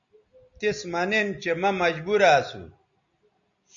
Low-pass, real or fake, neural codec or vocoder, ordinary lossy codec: 7.2 kHz; real; none; MP3, 96 kbps